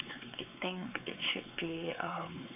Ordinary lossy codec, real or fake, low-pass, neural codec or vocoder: none; fake; 3.6 kHz; codec, 16 kHz, 4 kbps, X-Codec, WavLM features, trained on Multilingual LibriSpeech